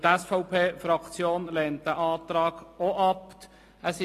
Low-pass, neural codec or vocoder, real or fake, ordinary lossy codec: 14.4 kHz; none; real; AAC, 48 kbps